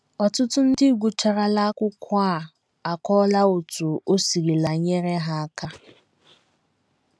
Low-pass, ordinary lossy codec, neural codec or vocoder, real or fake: none; none; none; real